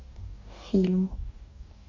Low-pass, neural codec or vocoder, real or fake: 7.2 kHz; codec, 44.1 kHz, 2.6 kbps, DAC; fake